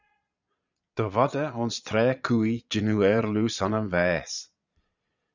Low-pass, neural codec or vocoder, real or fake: 7.2 kHz; vocoder, 22.05 kHz, 80 mel bands, Vocos; fake